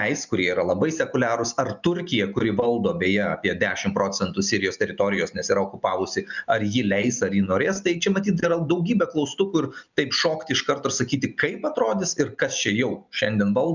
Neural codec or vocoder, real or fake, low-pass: none; real; 7.2 kHz